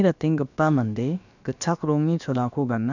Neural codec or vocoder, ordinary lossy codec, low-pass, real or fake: codec, 16 kHz, about 1 kbps, DyCAST, with the encoder's durations; none; 7.2 kHz; fake